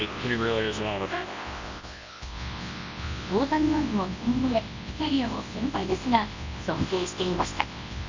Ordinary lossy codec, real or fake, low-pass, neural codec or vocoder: MP3, 64 kbps; fake; 7.2 kHz; codec, 24 kHz, 0.9 kbps, WavTokenizer, large speech release